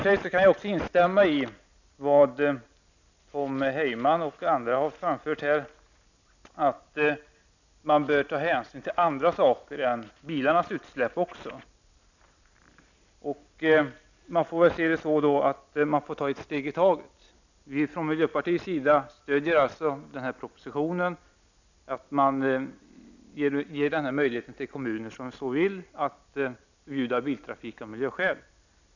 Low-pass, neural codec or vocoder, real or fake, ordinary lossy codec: 7.2 kHz; none; real; none